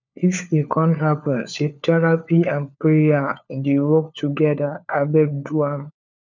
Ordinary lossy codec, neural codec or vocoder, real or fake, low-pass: none; codec, 16 kHz, 4 kbps, FunCodec, trained on LibriTTS, 50 frames a second; fake; 7.2 kHz